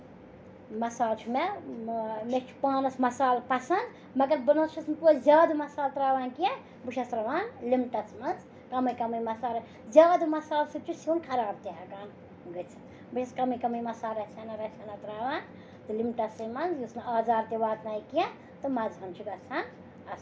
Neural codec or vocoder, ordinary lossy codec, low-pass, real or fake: none; none; none; real